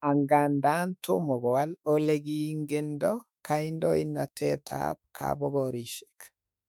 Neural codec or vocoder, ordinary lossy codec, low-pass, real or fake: autoencoder, 48 kHz, 32 numbers a frame, DAC-VAE, trained on Japanese speech; none; 19.8 kHz; fake